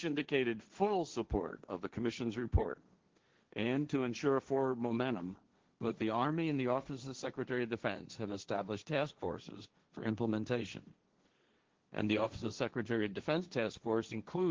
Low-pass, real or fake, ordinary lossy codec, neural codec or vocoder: 7.2 kHz; fake; Opus, 16 kbps; codec, 16 kHz, 1.1 kbps, Voila-Tokenizer